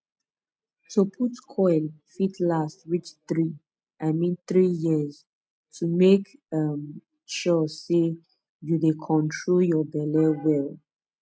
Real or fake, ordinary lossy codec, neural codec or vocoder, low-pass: real; none; none; none